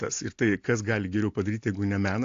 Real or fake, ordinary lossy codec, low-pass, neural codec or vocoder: real; AAC, 48 kbps; 7.2 kHz; none